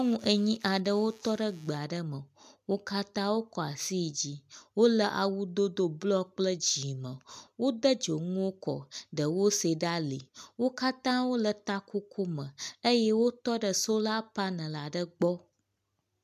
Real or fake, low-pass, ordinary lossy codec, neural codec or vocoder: real; 14.4 kHz; MP3, 96 kbps; none